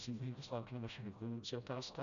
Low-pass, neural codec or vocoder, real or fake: 7.2 kHz; codec, 16 kHz, 0.5 kbps, FreqCodec, smaller model; fake